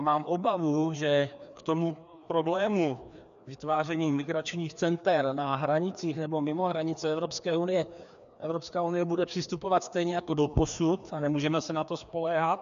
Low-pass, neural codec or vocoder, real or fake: 7.2 kHz; codec, 16 kHz, 2 kbps, FreqCodec, larger model; fake